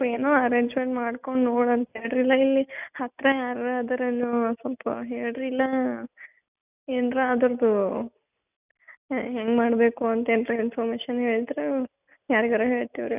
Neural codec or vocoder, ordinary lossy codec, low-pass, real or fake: none; none; 3.6 kHz; real